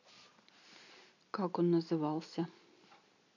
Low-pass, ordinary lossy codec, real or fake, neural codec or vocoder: 7.2 kHz; none; real; none